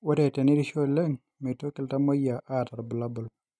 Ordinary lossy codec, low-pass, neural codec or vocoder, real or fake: none; none; none; real